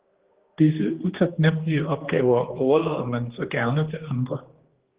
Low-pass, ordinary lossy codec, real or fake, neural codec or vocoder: 3.6 kHz; Opus, 16 kbps; fake; codec, 16 kHz, 2 kbps, X-Codec, HuBERT features, trained on general audio